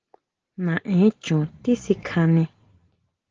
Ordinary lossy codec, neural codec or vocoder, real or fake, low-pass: Opus, 16 kbps; none; real; 7.2 kHz